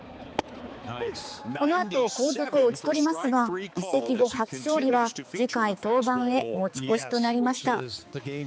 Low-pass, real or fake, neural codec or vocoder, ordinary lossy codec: none; fake; codec, 16 kHz, 4 kbps, X-Codec, HuBERT features, trained on balanced general audio; none